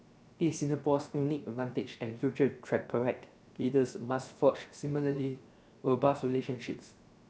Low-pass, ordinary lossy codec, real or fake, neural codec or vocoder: none; none; fake; codec, 16 kHz, 0.7 kbps, FocalCodec